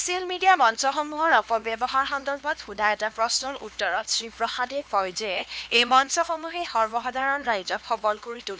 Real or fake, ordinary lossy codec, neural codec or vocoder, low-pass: fake; none; codec, 16 kHz, 2 kbps, X-Codec, HuBERT features, trained on LibriSpeech; none